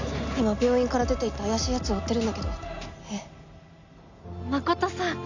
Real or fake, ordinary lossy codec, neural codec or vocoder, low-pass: real; none; none; 7.2 kHz